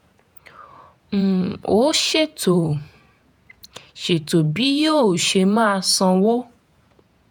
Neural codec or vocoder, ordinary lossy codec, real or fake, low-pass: vocoder, 48 kHz, 128 mel bands, Vocos; none; fake; none